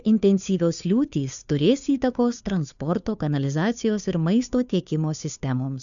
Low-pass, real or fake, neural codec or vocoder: 7.2 kHz; fake; codec, 16 kHz, 2 kbps, FunCodec, trained on Chinese and English, 25 frames a second